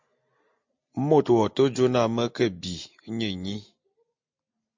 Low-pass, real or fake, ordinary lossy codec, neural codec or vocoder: 7.2 kHz; real; MP3, 48 kbps; none